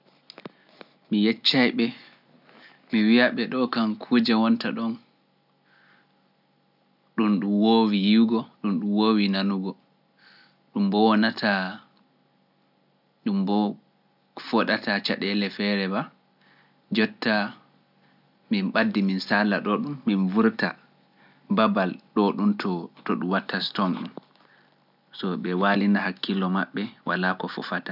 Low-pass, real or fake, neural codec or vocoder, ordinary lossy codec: 5.4 kHz; real; none; none